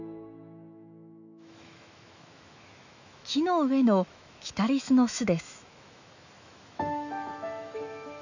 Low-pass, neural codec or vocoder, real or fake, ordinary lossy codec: 7.2 kHz; autoencoder, 48 kHz, 128 numbers a frame, DAC-VAE, trained on Japanese speech; fake; none